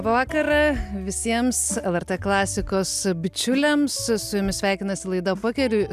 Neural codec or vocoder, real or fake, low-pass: none; real; 14.4 kHz